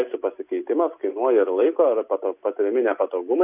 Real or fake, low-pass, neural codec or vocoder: real; 3.6 kHz; none